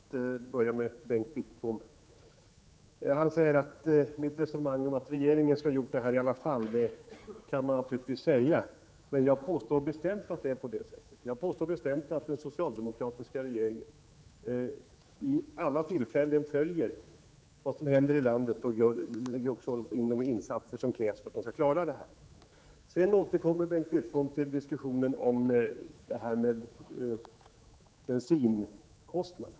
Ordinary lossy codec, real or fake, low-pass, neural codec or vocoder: none; fake; none; codec, 16 kHz, 4 kbps, X-Codec, HuBERT features, trained on general audio